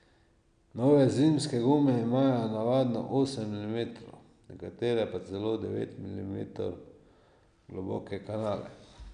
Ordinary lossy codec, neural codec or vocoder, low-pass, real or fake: none; none; 9.9 kHz; real